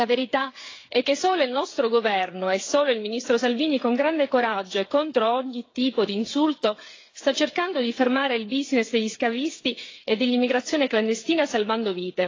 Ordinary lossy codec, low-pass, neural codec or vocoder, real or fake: AAC, 32 kbps; 7.2 kHz; codec, 16 kHz, 8 kbps, FreqCodec, smaller model; fake